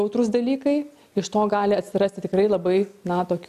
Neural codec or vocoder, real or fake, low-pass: none; real; 14.4 kHz